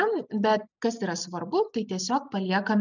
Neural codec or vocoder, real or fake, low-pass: none; real; 7.2 kHz